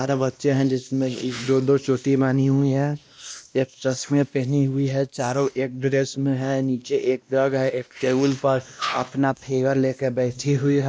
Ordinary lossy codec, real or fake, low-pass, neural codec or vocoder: none; fake; none; codec, 16 kHz, 1 kbps, X-Codec, WavLM features, trained on Multilingual LibriSpeech